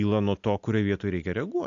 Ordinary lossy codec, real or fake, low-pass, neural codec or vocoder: AAC, 64 kbps; real; 7.2 kHz; none